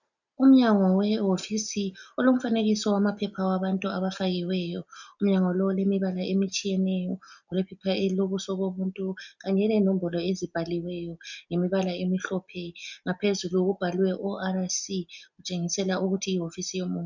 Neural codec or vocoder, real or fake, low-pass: none; real; 7.2 kHz